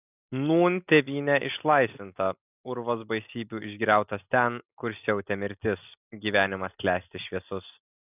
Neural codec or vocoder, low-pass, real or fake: none; 3.6 kHz; real